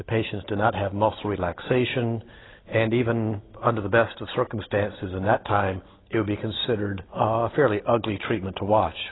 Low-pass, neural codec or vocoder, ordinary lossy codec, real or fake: 7.2 kHz; none; AAC, 16 kbps; real